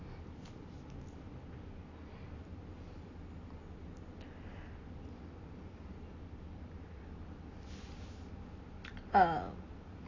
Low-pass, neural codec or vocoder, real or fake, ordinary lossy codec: 7.2 kHz; none; real; AAC, 32 kbps